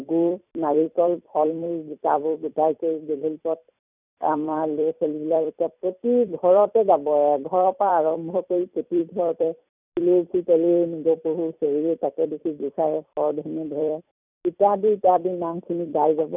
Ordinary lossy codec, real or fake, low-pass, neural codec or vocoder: none; fake; 3.6 kHz; vocoder, 44.1 kHz, 128 mel bands every 256 samples, BigVGAN v2